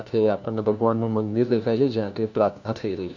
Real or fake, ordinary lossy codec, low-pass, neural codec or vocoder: fake; none; 7.2 kHz; codec, 16 kHz, 1 kbps, FunCodec, trained on LibriTTS, 50 frames a second